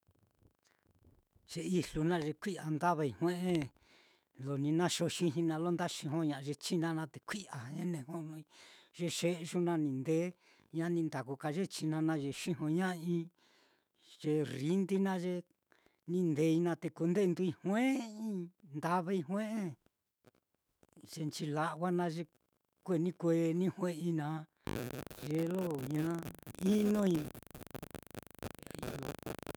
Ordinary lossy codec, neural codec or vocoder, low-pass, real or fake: none; autoencoder, 48 kHz, 128 numbers a frame, DAC-VAE, trained on Japanese speech; none; fake